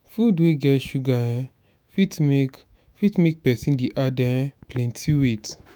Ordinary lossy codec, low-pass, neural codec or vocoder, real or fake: none; none; autoencoder, 48 kHz, 128 numbers a frame, DAC-VAE, trained on Japanese speech; fake